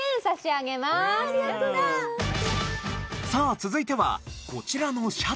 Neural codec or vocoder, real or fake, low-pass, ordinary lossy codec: none; real; none; none